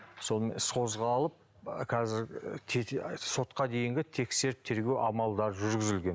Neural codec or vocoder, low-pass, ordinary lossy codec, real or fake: none; none; none; real